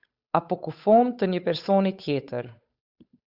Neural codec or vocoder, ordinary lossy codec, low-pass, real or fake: codec, 16 kHz, 8 kbps, FunCodec, trained on Chinese and English, 25 frames a second; Opus, 64 kbps; 5.4 kHz; fake